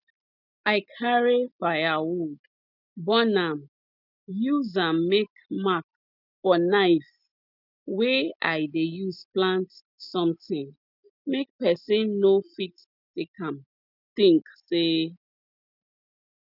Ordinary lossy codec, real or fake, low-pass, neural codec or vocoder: none; real; 5.4 kHz; none